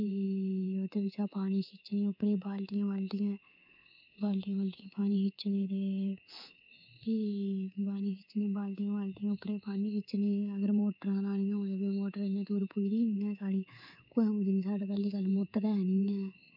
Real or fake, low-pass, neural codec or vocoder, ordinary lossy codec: fake; 5.4 kHz; codec, 24 kHz, 3.1 kbps, DualCodec; none